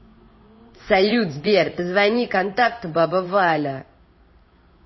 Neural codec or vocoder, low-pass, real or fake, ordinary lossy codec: codec, 16 kHz in and 24 kHz out, 1 kbps, XY-Tokenizer; 7.2 kHz; fake; MP3, 24 kbps